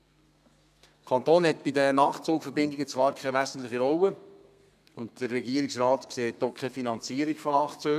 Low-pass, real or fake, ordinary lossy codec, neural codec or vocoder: 14.4 kHz; fake; none; codec, 32 kHz, 1.9 kbps, SNAC